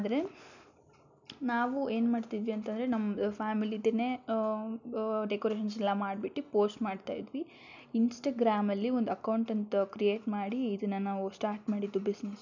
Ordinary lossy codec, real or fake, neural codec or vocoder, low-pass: none; real; none; 7.2 kHz